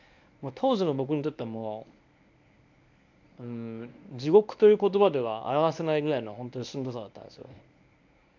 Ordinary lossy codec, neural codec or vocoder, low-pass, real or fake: none; codec, 24 kHz, 0.9 kbps, WavTokenizer, medium speech release version 1; 7.2 kHz; fake